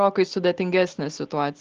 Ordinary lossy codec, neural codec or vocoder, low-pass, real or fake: Opus, 16 kbps; none; 7.2 kHz; real